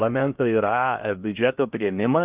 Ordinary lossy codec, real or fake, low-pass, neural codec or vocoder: Opus, 24 kbps; fake; 3.6 kHz; codec, 16 kHz in and 24 kHz out, 0.8 kbps, FocalCodec, streaming, 65536 codes